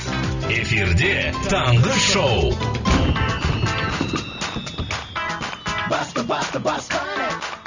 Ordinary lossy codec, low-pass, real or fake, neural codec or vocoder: Opus, 64 kbps; 7.2 kHz; real; none